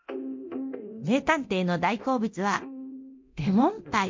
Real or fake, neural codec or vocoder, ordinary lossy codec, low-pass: fake; codec, 24 kHz, 0.9 kbps, DualCodec; MP3, 48 kbps; 7.2 kHz